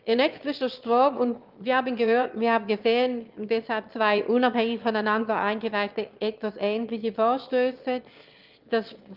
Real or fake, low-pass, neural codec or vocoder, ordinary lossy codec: fake; 5.4 kHz; autoencoder, 22.05 kHz, a latent of 192 numbers a frame, VITS, trained on one speaker; Opus, 24 kbps